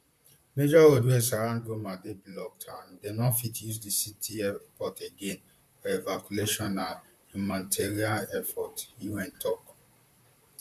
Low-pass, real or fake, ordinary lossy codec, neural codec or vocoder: 14.4 kHz; fake; MP3, 96 kbps; vocoder, 44.1 kHz, 128 mel bands, Pupu-Vocoder